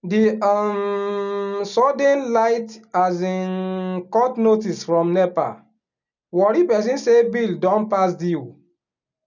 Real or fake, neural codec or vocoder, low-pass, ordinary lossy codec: real; none; 7.2 kHz; none